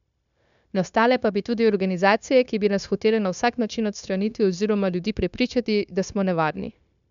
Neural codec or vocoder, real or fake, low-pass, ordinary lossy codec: codec, 16 kHz, 0.9 kbps, LongCat-Audio-Codec; fake; 7.2 kHz; none